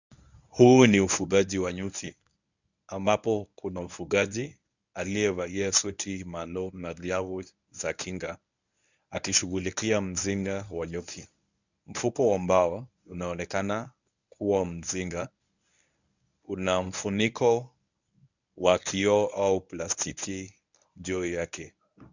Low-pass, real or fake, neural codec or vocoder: 7.2 kHz; fake; codec, 24 kHz, 0.9 kbps, WavTokenizer, medium speech release version 1